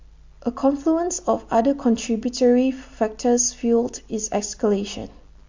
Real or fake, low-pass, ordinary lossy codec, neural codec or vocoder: real; 7.2 kHz; MP3, 48 kbps; none